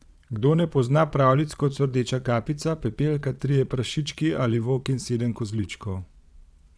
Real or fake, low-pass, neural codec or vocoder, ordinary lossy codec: fake; none; vocoder, 22.05 kHz, 80 mel bands, Vocos; none